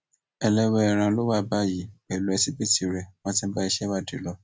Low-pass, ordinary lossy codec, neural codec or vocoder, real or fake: none; none; none; real